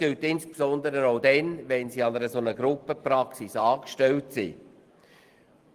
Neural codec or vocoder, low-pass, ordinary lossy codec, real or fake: none; 14.4 kHz; Opus, 24 kbps; real